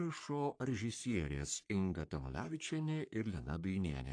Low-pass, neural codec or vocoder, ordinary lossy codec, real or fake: 9.9 kHz; codec, 44.1 kHz, 3.4 kbps, Pupu-Codec; AAC, 48 kbps; fake